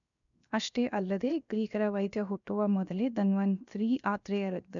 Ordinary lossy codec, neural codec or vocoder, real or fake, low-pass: none; codec, 16 kHz, 0.7 kbps, FocalCodec; fake; 7.2 kHz